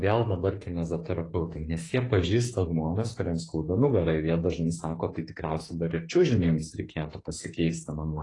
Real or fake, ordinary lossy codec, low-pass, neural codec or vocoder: fake; AAC, 32 kbps; 10.8 kHz; autoencoder, 48 kHz, 32 numbers a frame, DAC-VAE, trained on Japanese speech